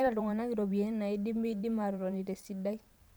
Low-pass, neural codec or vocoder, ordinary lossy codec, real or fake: none; vocoder, 44.1 kHz, 128 mel bands every 512 samples, BigVGAN v2; none; fake